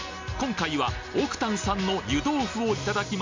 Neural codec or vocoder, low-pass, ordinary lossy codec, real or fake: none; 7.2 kHz; MP3, 64 kbps; real